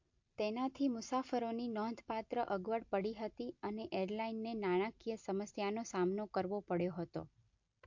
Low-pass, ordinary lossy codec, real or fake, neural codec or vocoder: 7.2 kHz; MP3, 48 kbps; real; none